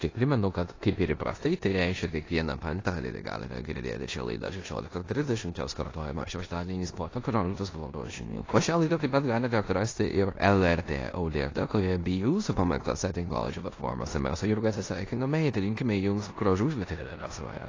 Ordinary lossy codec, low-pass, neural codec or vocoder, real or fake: AAC, 32 kbps; 7.2 kHz; codec, 16 kHz in and 24 kHz out, 0.9 kbps, LongCat-Audio-Codec, four codebook decoder; fake